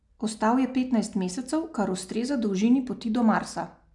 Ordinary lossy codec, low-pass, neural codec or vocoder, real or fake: MP3, 96 kbps; 10.8 kHz; none; real